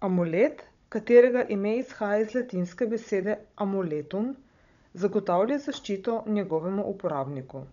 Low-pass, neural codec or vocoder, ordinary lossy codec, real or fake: 7.2 kHz; codec, 16 kHz, 16 kbps, FunCodec, trained on Chinese and English, 50 frames a second; none; fake